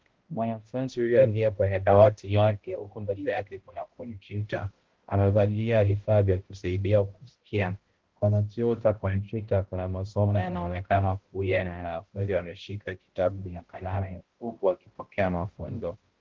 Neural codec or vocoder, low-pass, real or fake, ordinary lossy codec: codec, 16 kHz, 0.5 kbps, X-Codec, HuBERT features, trained on balanced general audio; 7.2 kHz; fake; Opus, 16 kbps